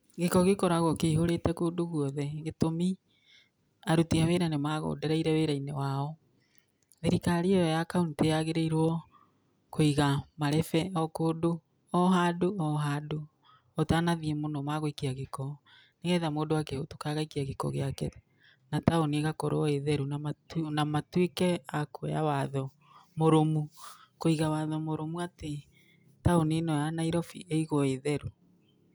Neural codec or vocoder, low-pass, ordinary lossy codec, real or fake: none; none; none; real